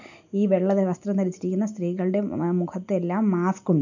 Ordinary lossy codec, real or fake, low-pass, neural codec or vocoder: none; real; 7.2 kHz; none